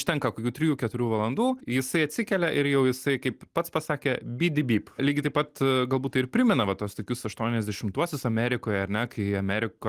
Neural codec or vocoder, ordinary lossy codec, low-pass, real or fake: none; Opus, 24 kbps; 14.4 kHz; real